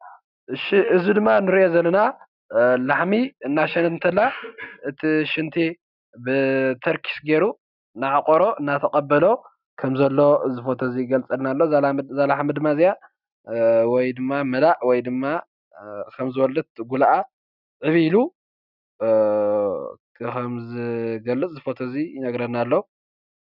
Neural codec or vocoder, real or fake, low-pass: none; real; 5.4 kHz